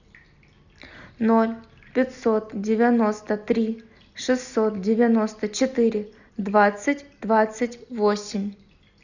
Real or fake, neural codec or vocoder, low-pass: real; none; 7.2 kHz